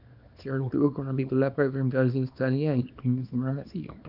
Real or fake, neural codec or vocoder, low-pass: fake; codec, 24 kHz, 0.9 kbps, WavTokenizer, small release; 5.4 kHz